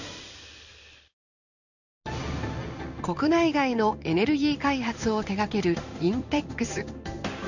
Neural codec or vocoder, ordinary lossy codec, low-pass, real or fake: codec, 16 kHz in and 24 kHz out, 1 kbps, XY-Tokenizer; none; 7.2 kHz; fake